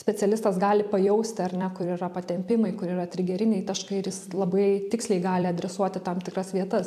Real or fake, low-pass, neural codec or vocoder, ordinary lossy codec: fake; 14.4 kHz; vocoder, 44.1 kHz, 128 mel bands every 256 samples, BigVGAN v2; AAC, 96 kbps